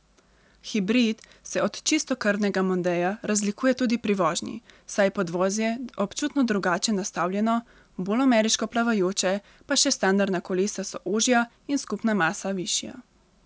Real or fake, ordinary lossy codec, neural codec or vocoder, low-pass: real; none; none; none